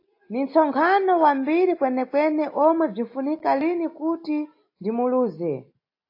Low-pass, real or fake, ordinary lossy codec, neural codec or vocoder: 5.4 kHz; real; AAC, 32 kbps; none